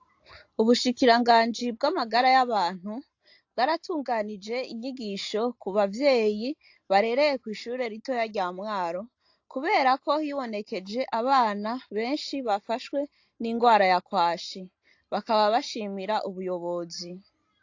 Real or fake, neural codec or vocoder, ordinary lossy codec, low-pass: real; none; AAC, 48 kbps; 7.2 kHz